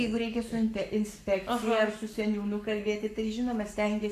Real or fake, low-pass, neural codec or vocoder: fake; 14.4 kHz; codec, 44.1 kHz, 7.8 kbps, Pupu-Codec